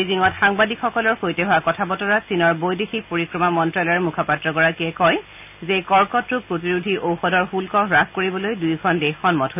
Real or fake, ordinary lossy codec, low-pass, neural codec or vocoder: real; none; 3.6 kHz; none